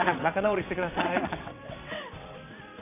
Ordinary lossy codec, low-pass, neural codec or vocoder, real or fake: none; 3.6 kHz; vocoder, 22.05 kHz, 80 mel bands, WaveNeXt; fake